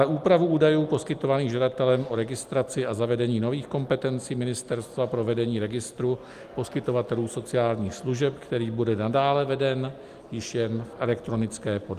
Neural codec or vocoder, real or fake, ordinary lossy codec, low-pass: none; real; Opus, 32 kbps; 14.4 kHz